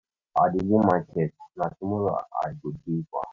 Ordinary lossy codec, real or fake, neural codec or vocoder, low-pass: none; real; none; 7.2 kHz